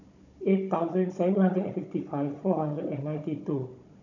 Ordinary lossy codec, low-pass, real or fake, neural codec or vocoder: none; 7.2 kHz; fake; codec, 16 kHz, 16 kbps, FunCodec, trained on Chinese and English, 50 frames a second